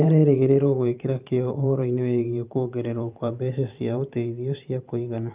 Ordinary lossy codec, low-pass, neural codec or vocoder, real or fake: Opus, 24 kbps; 3.6 kHz; vocoder, 44.1 kHz, 128 mel bands every 512 samples, BigVGAN v2; fake